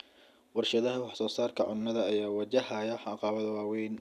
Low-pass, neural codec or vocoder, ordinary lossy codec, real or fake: 14.4 kHz; none; none; real